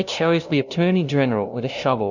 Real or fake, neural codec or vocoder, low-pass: fake; codec, 16 kHz, 0.5 kbps, FunCodec, trained on LibriTTS, 25 frames a second; 7.2 kHz